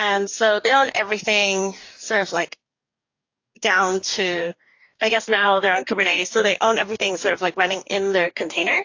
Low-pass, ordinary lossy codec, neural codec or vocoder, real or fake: 7.2 kHz; AAC, 48 kbps; codec, 44.1 kHz, 2.6 kbps, DAC; fake